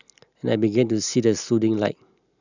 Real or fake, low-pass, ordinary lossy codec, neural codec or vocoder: real; 7.2 kHz; none; none